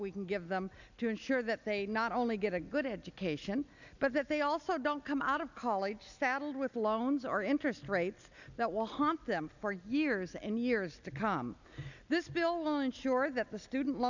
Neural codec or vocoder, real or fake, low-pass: none; real; 7.2 kHz